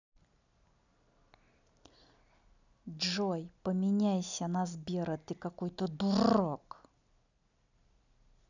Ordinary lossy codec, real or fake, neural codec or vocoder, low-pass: none; real; none; 7.2 kHz